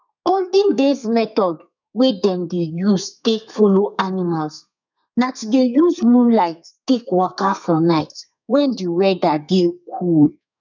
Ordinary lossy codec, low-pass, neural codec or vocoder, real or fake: none; 7.2 kHz; codec, 32 kHz, 1.9 kbps, SNAC; fake